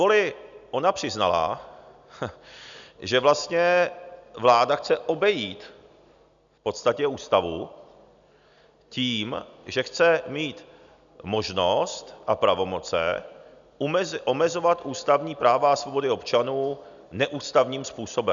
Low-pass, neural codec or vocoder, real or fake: 7.2 kHz; none; real